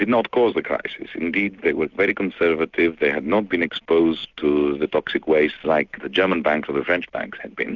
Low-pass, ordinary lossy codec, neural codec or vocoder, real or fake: 7.2 kHz; Opus, 64 kbps; none; real